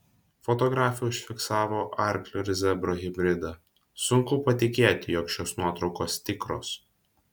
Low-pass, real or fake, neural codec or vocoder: 19.8 kHz; real; none